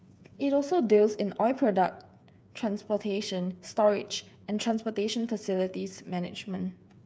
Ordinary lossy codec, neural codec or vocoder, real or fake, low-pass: none; codec, 16 kHz, 8 kbps, FreqCodec, smaller model; fake; none